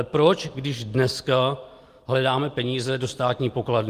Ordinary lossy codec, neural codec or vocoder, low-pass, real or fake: Opus, 24 kbps; none; 14.4 kHz; real